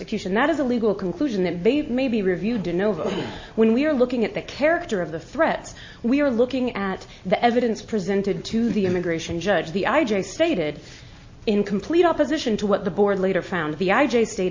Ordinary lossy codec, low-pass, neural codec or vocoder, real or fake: MP3, 32 kbps; 7.2 kHz; none; real